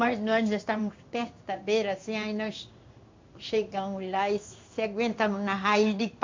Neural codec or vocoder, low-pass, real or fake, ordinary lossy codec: codec, 16 kHz in and 24 kHz out, 1 kbps, XY-Tokenizer; 7.2 kHz; fake; MP3, 48 kbps